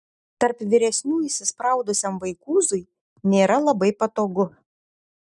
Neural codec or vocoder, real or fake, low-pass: none; real; 10.8 kHz